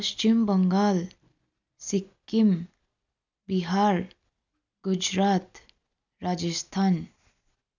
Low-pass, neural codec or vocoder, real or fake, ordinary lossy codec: 7.2 kHz; none; real; none